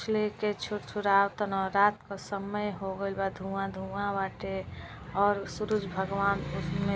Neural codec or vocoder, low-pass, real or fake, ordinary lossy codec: none; none; real; none